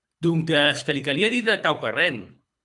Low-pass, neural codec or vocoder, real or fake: 10.8 kHz; codec, 24 kHz, 3 kbps, HILCodec; fake